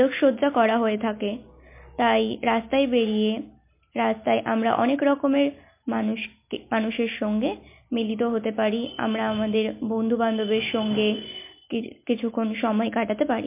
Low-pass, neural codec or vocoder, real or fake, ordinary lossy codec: 3.6 kHz; none; real; MP3, 24 kbps